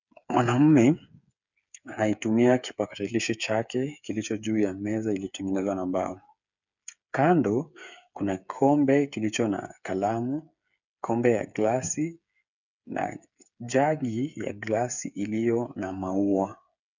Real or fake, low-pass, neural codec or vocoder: fake; 7.2 kHz; codec, 16 kHz, 8 kbps, FreqCodec, smaller model